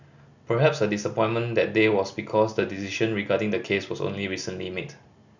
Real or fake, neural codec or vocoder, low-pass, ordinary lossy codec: real; none; 7.2 kHz; none